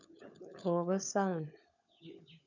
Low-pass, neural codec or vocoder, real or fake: 7.2 kHz; codec, 16 kHz, 16 kbps, FunCodec, trained on LibriTTS, 50 frames a second; fake